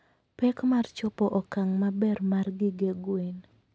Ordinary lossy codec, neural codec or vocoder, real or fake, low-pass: none; none; real; none